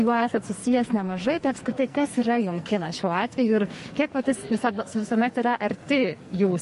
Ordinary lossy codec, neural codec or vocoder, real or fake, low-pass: MP3, 48 kbps; codec, 44.1 kHz, 2.6 kbps, SNAC; fake; 14.4 kHz